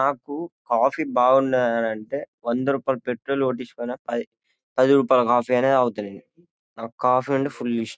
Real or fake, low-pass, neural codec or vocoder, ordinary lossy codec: real; none; none; none